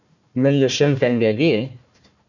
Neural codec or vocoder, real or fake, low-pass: codec, 16 kHz, 1 kbps, FunCodec, trained on Chinese and English, 50 frames a second; fake; 7.2 kHz